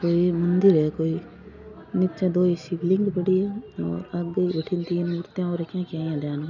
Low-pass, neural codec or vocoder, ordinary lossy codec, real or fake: 7.2 kHz; none; none; real